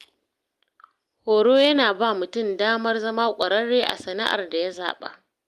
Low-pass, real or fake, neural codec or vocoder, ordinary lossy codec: 14.4 kHz; real; none; Opus, 32 kbps